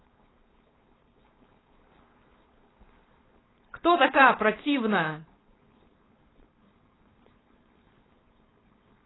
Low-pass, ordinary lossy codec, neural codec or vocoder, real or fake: 7.2 kHz; AAC, 16 kbps; codec, 16 kHz, 4.8 kbps, FACodec; fake